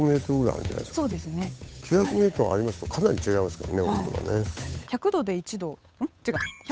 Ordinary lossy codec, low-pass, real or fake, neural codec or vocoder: none; none; fake; codec, 16 kHz, 8 kbps, FunCodec, trained on Chinese and English, 25 frames a second